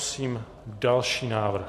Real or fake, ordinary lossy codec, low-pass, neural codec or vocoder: real; MP3, 64 kbps; 14.4 kHz; none